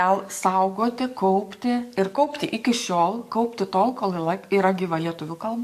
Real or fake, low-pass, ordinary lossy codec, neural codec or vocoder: fake; 14.4 kHz; MP3, 64 kbps; codec, 44.1 kHz, 7.8 kbps, DAC